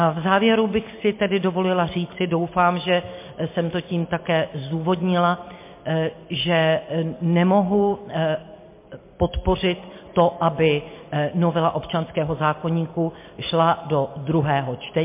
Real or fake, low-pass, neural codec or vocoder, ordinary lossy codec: real; 3.6 kHz; none; MP3, 24 kbps